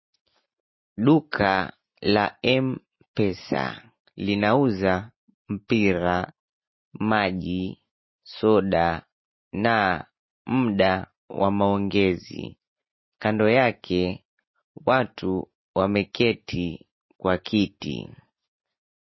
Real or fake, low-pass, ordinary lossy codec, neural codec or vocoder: real; 7.2 kHz; MP3, 24 kbps; none